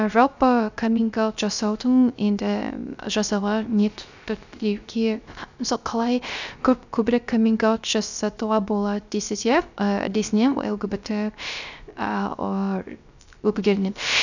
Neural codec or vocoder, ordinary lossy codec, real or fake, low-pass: codec, 16 kHz, 0.3 kbps, FocalCodec; none; fake; 7.2 kHz